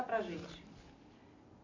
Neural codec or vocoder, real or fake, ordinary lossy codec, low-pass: none; real; MP3, 64 kbps; 7.2 kHz